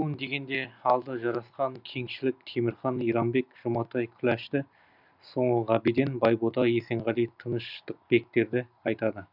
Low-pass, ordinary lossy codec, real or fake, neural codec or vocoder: 5.4 kHz; none; real; none